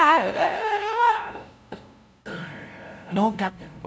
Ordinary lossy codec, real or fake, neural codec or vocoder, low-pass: none; fake; codec, 16 kHz, 0.5 kbps, FunCodec, trained on LibriTTS, 25 frames a second; none